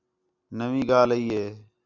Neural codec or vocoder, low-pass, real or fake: none; 7.2 kHz; real